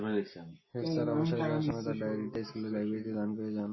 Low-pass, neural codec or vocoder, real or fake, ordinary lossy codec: 7.2 kHz; none; real; MP3, 24 kbps